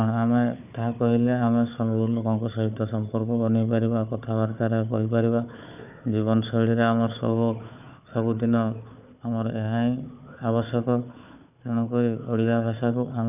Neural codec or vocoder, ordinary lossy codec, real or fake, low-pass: codec, 16 kHz, 4 kbps, FunCodec, trained on Chinese and English, 50 frames a second; none; fake; 3.6 kHz